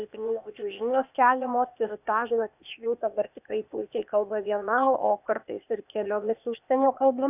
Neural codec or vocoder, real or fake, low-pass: codec, 16 kHz, 0.8 kbps, ZipCodec; fake; 3.6 kHz